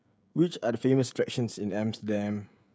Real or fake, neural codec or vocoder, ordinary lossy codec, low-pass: fake; codec, 16 kHz, 16 kbps, FreqCodec, smaller model; none; none